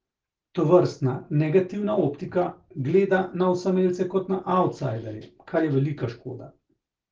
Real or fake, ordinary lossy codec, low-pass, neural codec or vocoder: real; Opus, 16 kbps; 7.2 kHz; none